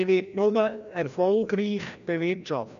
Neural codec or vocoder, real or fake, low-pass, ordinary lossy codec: codec, 16 kHz, 1 kbps, FreqCodec, larger model; fake; 7.2 kHz; none